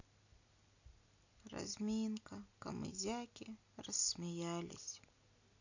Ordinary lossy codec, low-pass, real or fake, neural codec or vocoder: none; 7.2 kHz; real; none